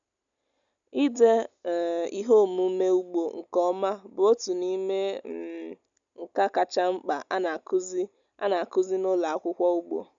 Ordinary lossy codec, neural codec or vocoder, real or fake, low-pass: none; none; real; 7.2 kHz